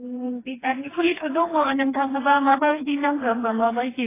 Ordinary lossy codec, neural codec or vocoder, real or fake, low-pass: AAC, 16 kbps; codec, 16 kHz, 2 kbps, FreqCodec, smaller model; fake; 3.6 kHz